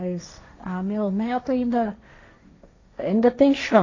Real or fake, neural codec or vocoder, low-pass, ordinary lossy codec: fake; codec, 16 kHz, 1.1 kbps, Voila-Tokenizer; 7.2 kHz; AAC, 32 kbps